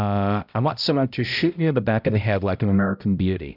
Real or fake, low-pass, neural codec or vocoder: fake; 5.4 kHz; codec, 16 kHz, 0.5 kbps, X-Codec, HuBERT features, trained on balanced general audio